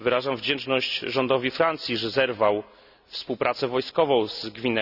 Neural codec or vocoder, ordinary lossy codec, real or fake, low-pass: none; none; real; 5.4 kHz